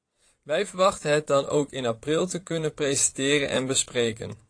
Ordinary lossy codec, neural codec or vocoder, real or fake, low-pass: AAC, 48 kbps; vocoder, 22.05 kHz, 80 mel bands, Vocos; fake; 9.9 kHz